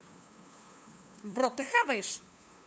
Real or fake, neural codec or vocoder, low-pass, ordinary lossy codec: fake; codec, 16 kHz, 2 kbps, FunCodec, trained on LibriTTS, 25 frames a second; none; none